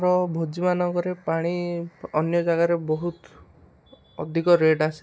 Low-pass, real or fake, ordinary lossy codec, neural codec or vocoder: none; real; none; none